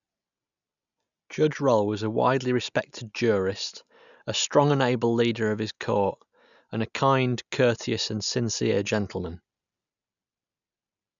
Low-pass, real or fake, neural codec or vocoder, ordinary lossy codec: 7.2 kHz; real; none; none